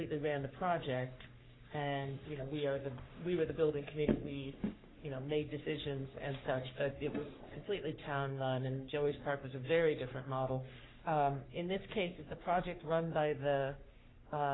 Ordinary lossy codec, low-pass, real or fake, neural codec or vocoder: AAC, 16 kbps; 7.2 kHz; fake; codec, 44.1 kHz, 3.4 kbps, Pupu-Codec